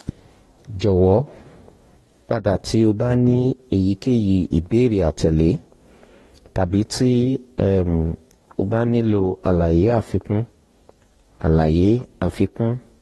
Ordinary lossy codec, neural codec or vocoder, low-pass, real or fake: AAC, 32 kbps; codec, 44.1 kHz, 2.6 kbps, DAC; 19.8 kHz; fake